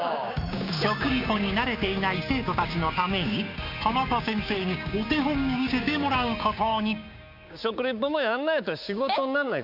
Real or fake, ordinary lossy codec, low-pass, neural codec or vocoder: fake; none; 5.4 kHz; codec, 16 kHz, 6 kbps, DAC